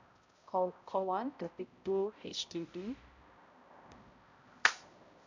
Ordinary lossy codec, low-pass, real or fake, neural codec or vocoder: MP3, 64 kbps; 7.2 kHz; fake; codec, 16 kHz, 0.5 kbps, X-Codec, HuBERT features, trained on balanced general audio